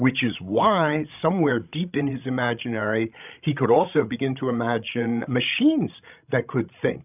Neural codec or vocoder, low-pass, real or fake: codec, 16 kHz, 16 kbps, FreqCodec, larger model; 3.6 kHz; fake